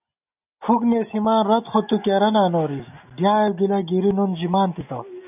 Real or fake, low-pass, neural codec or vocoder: real; 3.6 kHz; none